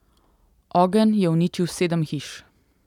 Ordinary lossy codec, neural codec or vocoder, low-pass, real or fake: none; none; 19.8 kHz; real